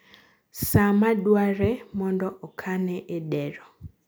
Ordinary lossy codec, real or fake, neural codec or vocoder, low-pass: none; real; none; none